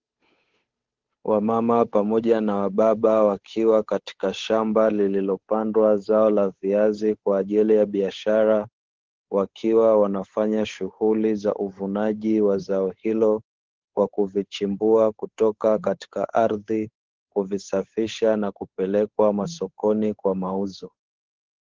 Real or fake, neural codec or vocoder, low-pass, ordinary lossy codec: fake; codec, 16 kHz, 8 kbps, FunCodec, trained on Chinese and English, 25 frames a second; 7.2 kHz; Opus, 16 kbps